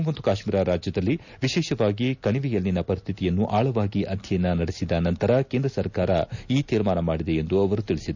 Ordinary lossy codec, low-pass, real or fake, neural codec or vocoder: none; 7.2 kHz; real; none